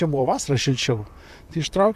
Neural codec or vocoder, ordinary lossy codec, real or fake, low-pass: vocoder, 44.1 kHz, 128 mel bands, Pupu-Vocoder; MP3, 96 kbps; fake; 14.4 kHz